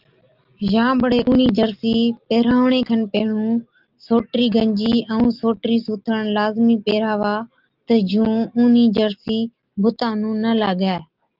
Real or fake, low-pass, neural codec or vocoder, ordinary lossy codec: real; 5.4 kHz; none; Opus, 32 kbps